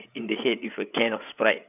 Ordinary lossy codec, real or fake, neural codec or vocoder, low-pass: none; fake; codec, 16 kHz, 8 kbps, FreqCodec, larger model; 3.6 kHz